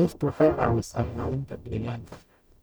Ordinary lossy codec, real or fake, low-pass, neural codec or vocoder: none; fake; none; codec, 44.1 kHz, 0.9 kbps, DAC